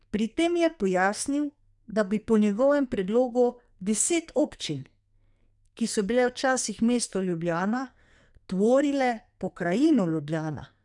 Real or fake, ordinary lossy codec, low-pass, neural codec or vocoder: fake; none; 10.8 kHz; codec, 44.1 kHz, 2.6 kbps, SNAC